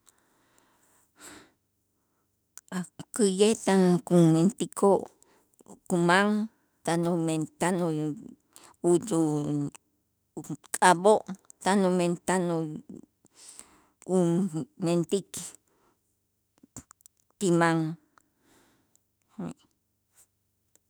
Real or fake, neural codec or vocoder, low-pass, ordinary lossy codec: fake; autoencoder, 48 kHz, 32 numbers a frame, DAC-VAE, trained on Japanese speech; none; none